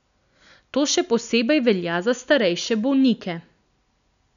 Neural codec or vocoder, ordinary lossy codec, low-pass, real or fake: none; none; 7.2 kHz; real